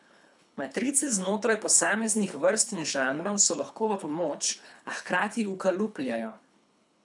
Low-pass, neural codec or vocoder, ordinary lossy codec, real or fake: 10.8 kHz; codec, 24 kHz, 3 kbps, HILCodec; none; fake